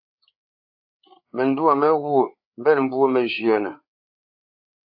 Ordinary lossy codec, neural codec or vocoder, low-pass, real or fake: AAC, 48 kbps; codec, 16 kHz, 4 kbps, FreqCodec, larger model; 5.4 kHz; fake